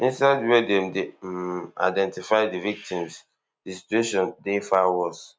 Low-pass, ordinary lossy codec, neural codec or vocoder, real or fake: none; none; none; real